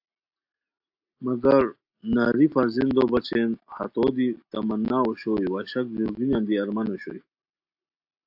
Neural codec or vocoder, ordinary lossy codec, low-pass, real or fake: none; AAC, 48 kbps; 5.4 kHz; real